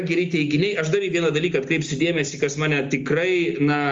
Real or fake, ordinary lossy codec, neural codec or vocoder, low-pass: real; Opus, 32 kbps; none; 7.2 kHz